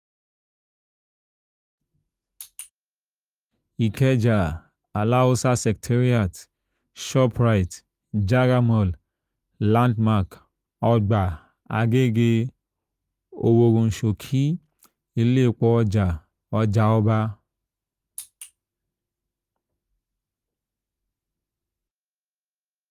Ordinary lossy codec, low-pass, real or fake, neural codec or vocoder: Opus, 32 kbps; 14.4 kHz; real; none